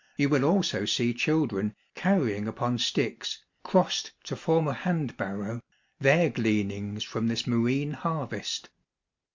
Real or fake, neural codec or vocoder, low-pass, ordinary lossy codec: real; none; 7.2 kHz; MP3, 64 kbps